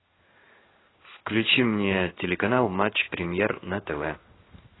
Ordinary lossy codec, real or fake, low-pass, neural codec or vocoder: AAC, 16 kbps; fake; 7.2 kHz; codec, 16 kHz in and 24 kHz out, 1 kbps, XY-Tokenizer